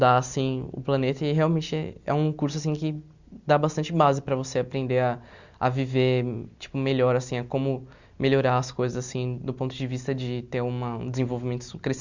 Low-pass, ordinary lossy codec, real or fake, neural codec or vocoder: 7.2 kHz; Opus, 64 kbps; real; none